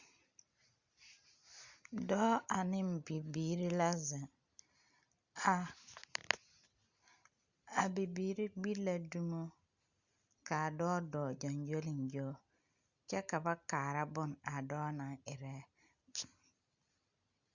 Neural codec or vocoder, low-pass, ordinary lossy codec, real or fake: none; 7.2 kHz; Opus, 64 kbps; real